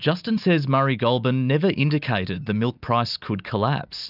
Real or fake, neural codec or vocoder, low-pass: real; none; 5.4 kHz